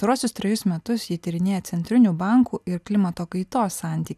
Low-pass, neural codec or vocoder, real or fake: 14.4 kHz; none; real